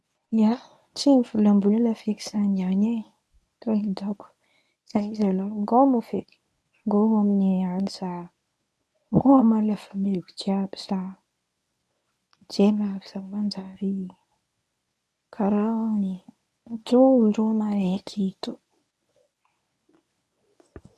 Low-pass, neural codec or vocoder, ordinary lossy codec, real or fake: none; codec, 24 kHz, 0.9 kbps, WavTokenizer, medium speech release version 1; none; fake